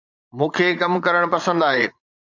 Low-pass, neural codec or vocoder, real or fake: 7.2 kHz; vocoder, 22.05 kHz, 80 mel bands, Vocos; fake